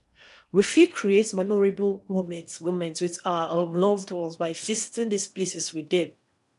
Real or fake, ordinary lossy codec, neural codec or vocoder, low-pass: fake; none; codec, 16 kHz in and 24 kHz out, 0.8 kbps, FocalCodec, streaming, 65536 codes; 10.8 kHz